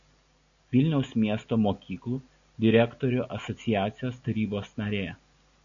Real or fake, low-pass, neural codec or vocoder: real; 7.2 kHz; none